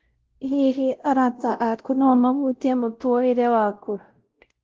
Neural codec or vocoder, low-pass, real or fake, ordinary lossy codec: codec, 16 kHz, 0.5 kbps, X-Codec, WavLM features, trained on Multilingual LibriSpeech; 7.2 kHz; fake; Opus, 16 kbps